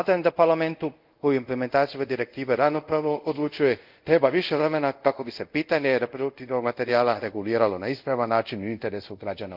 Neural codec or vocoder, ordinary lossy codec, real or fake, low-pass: codec, 24 kHz, 0.5 kbps, DualCodec; Opus, 24 kbps; fake; 5.4 kHz